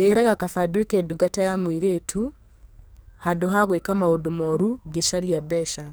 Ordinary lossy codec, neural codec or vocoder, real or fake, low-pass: none; codec, 44.1 kHz, 2.6 kbps, SNAC; fake; none